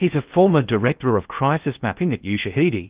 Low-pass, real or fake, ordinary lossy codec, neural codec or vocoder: 3.6 kHz; fake; Opus, 32 kbps; codec, 16 kHz in and 24 kHz out, 0.6 kbps, FocalCodec, streaming, 4096 codes